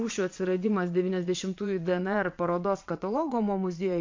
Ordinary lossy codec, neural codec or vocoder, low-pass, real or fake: MP3, 48 kbps; vocoder, 22.05 kHz, 80 mel bands, WaveNeXt; 7.2 kHz; fake